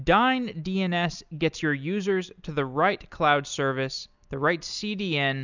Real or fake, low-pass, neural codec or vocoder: real; 7.2 kHz; none